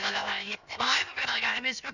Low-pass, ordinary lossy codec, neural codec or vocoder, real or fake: 7.2 kHz; none; codec, 16 kHz, 0.7 kbps, FocalCodec; fake